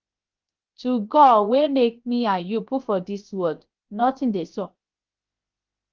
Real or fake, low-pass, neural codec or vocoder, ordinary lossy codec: fake; 7.2 kHz; codec, 16 kHz, 0.7 kbps, FocalCodec; Opus, 32 kbps